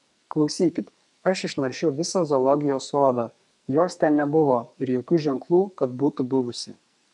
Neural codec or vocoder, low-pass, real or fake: codec, 44.1 kHz, 2.6 kbps, SNAC; 10.8 kHz; fake